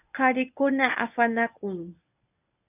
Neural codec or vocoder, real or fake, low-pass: codec, 24 kHz, 0.9 kbps, WavTokenizer, medium speech release version 1; fake; 3.6 kHz